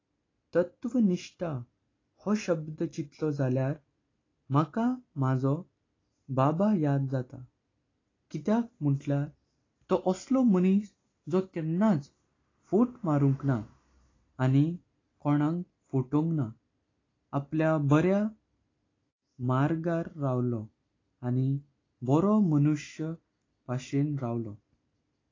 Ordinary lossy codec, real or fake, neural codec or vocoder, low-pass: AAC, 32 kbps; real; none; 7.2 kHz